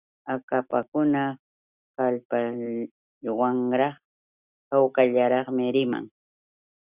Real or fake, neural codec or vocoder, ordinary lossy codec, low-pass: real; none; Opus, 64 kbps; 3.6 kHz